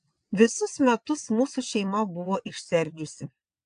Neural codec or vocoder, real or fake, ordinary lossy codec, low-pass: vocoder, 22.05 kHz, 80 mel bands, WaveNeXt; fake; AAC, 64 kbps; 9.9 kHz